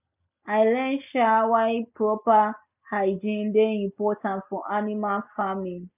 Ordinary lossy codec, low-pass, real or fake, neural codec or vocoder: none; 3.6 kHz; real; none